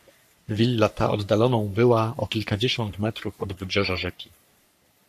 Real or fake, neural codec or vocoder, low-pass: fake; codec, 44.1 kHz, 3.4 kbps, Pupu-Codec; 14.4 kHz